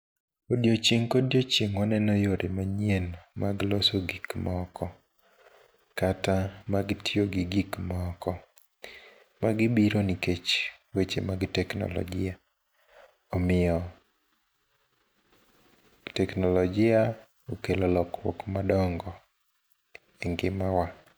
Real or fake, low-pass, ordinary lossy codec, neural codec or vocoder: real; none; none; none